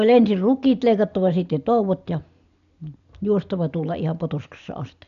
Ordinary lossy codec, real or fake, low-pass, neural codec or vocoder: none; real; 7.2 kHz; none